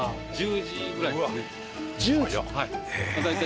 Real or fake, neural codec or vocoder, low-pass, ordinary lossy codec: real; none; none; none